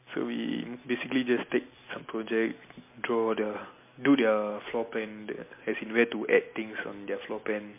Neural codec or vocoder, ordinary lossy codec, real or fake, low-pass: none; MP3, 24 kbps; real; 3.6 kHz